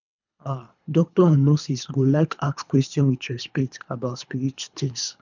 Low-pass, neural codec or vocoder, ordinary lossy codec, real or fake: 7.2 kHz; codec, 24 kHz, 3 kbps, HILCodec; none; fake